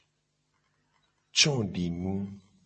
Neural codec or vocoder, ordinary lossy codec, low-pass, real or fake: none; MP3, 32 kbps; 10.8 kHz; real